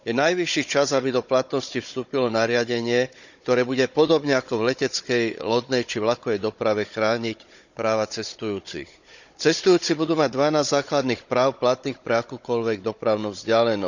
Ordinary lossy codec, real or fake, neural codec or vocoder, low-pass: none; fake; codec, 16 kHz, 16 kbps, FunCodec, trained on Chinese and English, 50 frames a second; 7.2 kHz